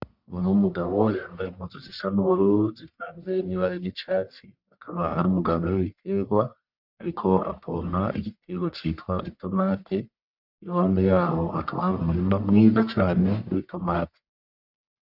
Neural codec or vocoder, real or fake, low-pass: codec, 44.1 kHz, 1.7 kbps, Pupu-Codec; fake; 5.4 kHz